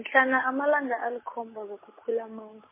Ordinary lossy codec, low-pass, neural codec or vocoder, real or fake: MP3, 16 kbps; 3.6 kHz; none; real